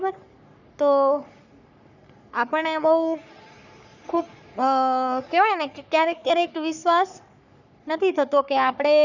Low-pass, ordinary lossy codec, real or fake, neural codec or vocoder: 7.2 kHz; none; fake; codec, 44.1 kHz, 3.4 kbps, Pupu-Codec